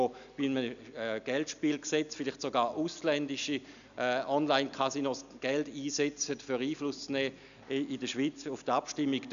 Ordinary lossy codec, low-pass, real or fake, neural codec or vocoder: none; 7.2 kHz; real; none